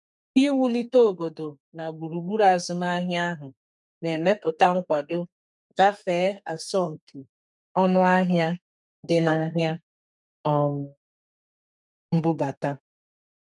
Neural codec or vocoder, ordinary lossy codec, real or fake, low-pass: codec, 32 kHz, 1.9 kbps, SNAC; none; fake; 10.8 kHz